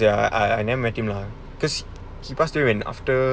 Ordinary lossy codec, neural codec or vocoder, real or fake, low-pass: none; none; real; none